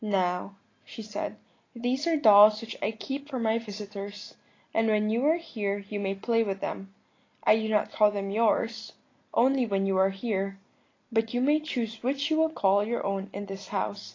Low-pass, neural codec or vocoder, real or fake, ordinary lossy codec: 7.2 kHz; none; real; AAC, 32 kbps